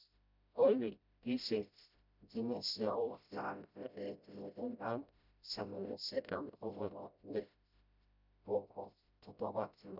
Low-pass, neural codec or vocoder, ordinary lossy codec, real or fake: 5.4 kHz; codec, 16 kHz, 0.5 kbps, FreqCodec, smaller model; none; fake